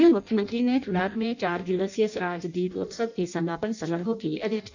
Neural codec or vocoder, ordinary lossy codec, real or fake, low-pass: codec, 16 kHz in and 24 kHz out, 0.6 kbps, FireRedTTS-2 codec; none; fake; 7.2 kHz